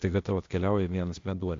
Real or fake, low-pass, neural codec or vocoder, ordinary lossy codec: fake; 7.2 kHz; codec, 16 kHz, 0.8 kbps, ZipCodec; AAC, 48 kbps